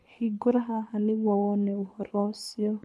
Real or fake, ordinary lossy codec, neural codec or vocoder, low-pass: fake; none; codec, 24 kHz, 6 kbps, HILCodec; none